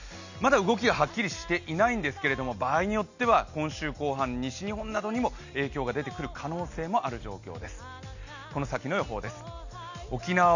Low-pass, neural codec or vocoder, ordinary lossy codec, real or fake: 7.2 kHz; none; none; real